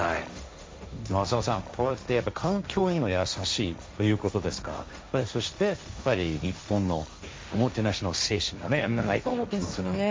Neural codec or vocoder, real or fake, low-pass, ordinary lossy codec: codec, 16 kHz, 1.1 kbps, Voila-Tokenizer; fake; none; none